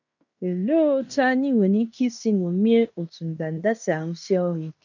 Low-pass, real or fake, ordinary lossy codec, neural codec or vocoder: 7.2 kHz; fake; none; codec, 16 kHz in and 24 kHz out, 0.9 kbps, LongCat-Audio-Codec, fine tuned four codebook decoder